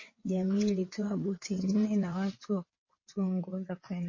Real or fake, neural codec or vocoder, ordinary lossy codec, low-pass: fake; vocoder, 22.05 kHz, 80 mel bands, WaveNeXt; MP3, 32 kbps; 7.2 kHz